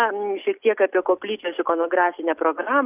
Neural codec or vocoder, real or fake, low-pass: none; real; 3.6 kHz